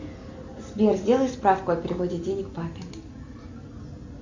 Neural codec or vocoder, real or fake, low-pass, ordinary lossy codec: none; real; 7.2 kHz; MP3, 48 kbps